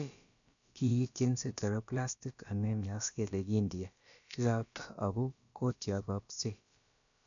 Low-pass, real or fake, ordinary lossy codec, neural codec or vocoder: 7.2 kHz; fake; none; codec, 16 kHz, about 1 kbps, DyCAST, with the encoder's durations